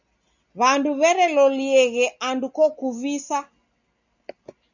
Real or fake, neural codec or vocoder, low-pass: real; none; 7.2 kHz